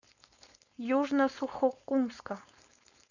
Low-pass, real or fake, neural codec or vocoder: 7.2 kHz; fake; codec, 16 kHz, 4.8 kbps, FACodec